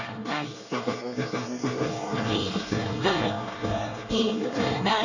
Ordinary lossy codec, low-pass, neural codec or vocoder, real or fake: none; 7.2 kHz; codec, 24 kHz, 1 kbps, SNAC; fake